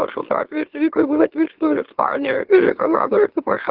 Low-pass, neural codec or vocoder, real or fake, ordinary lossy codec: 5.4 kHz; autoencoder, 44.1 kHz, a latent of 192 numbers a frame, MeloTTS; fake; Opus, 16 kbps